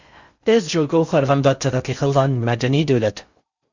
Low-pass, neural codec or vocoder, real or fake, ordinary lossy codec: 7.2 kHz; codec, 16 kHz in and 24 kHz out, 0.6 kbps, FocalCodec, streaming, 2048 codes; fake; Opus, 64 kbps